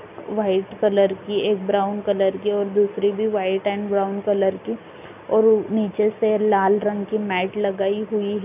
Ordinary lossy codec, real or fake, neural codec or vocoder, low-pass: none; real; none; 3.6 kHz